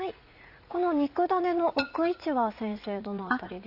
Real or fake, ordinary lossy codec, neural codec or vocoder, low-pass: fake; AAC, 48 kbps; vocoder, 44.1 kHz, 80 mel bands, Vocos; 5.4 kHz